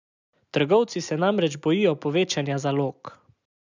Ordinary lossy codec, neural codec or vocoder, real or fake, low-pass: none; none; real; 7.2 kHz